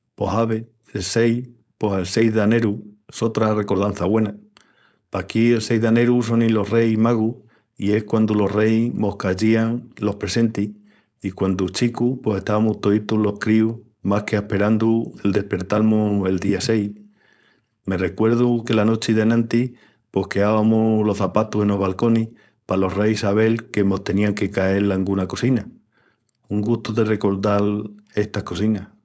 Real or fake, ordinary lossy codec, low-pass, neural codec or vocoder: fake; none; none; codec, 16 kHz, 4.8 kbps, FACodec